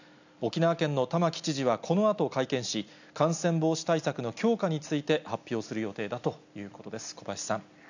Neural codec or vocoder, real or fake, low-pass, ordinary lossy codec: none; real; 7.2 kHz; none